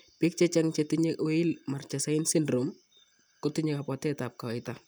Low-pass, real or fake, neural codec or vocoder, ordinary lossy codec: none; real; none; none